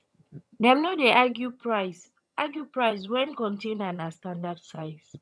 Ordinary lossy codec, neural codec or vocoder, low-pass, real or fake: none; vocoder, 22.05 kHz, 80 mel bands, HiFi-GAN; none; fake